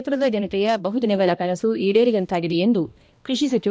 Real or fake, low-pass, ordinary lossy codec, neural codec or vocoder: fake; none; none; codec, 16 kHz, 1 kbps, X-Codec, HuBERT features, trained on balanced general audio